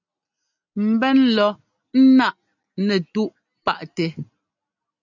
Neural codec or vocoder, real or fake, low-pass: none; real; 7.2 kHz